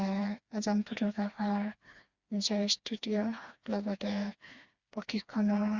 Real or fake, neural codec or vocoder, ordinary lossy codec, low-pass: fake; codec, 16 kHz, 2 kbps, FreqCodec, smaller model; Opus, 64 kbps; 7.2 kHz